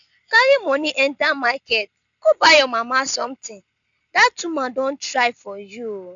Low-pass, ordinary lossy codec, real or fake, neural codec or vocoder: 7.2 kHz; none; real; none